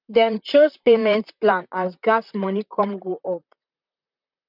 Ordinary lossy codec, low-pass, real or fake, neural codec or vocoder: none; 5.4 kHz; fake; vocoder, 44.1 kHz, 128 mel bands, Pupu-Vocoder